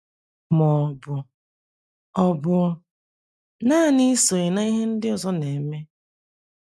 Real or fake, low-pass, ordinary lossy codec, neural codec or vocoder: real; none; none; none